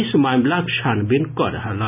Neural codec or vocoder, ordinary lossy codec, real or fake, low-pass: none; none; real; 3.6 kHz